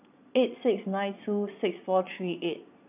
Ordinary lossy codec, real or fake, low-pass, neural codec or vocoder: none; fake; 3.6 kHz; vocoder, 22.05 kHz, 80 mel bands, Vocos